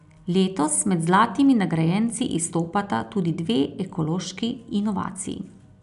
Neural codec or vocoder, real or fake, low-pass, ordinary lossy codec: none; real; 10.8 kHz; none